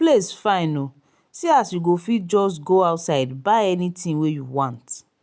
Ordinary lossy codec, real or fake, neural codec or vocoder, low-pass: none; real; none; none